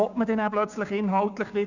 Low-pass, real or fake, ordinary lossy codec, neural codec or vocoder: 7.2 kHz; fake; none; codec, 16 kHz, 6 kbps, DAC